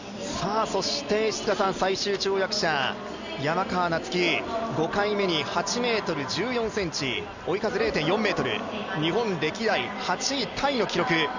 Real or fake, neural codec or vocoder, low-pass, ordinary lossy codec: real; none; 7.2 kHz; Opus, 64 kbps